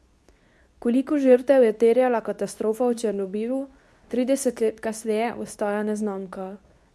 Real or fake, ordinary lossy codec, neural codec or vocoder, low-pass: fake; none; codec, 24 kHz, 0.9 kbps, WavTokenizer, medium speech release version 2; none